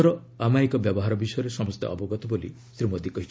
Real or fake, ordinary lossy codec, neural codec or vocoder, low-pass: real; none; none; none